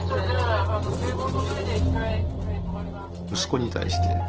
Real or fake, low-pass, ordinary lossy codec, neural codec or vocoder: real; 7.2 kHz; Opus, 16 kbps; none